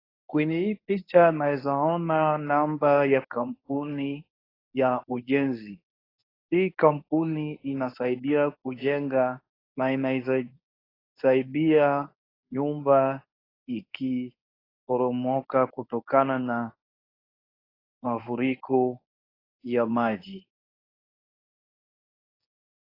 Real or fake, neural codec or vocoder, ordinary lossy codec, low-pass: fake; codec, 24 kHz, 0.9 kbps, WavTokenizer, medium speech release version 1; AAC, 24 kbps; 5.4 kHz